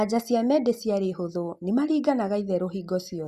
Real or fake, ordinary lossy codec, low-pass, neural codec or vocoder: real; Opus, 64 kbps; 14.4 kHz; none